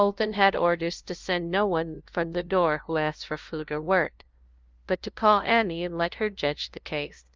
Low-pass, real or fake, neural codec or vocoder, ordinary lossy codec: 7.2 kHz; fake; codec, 16 kHz, 0.5 kbps, FunCodec, trained on LibriTTS, 25 frames a second; Opus, 32 kbps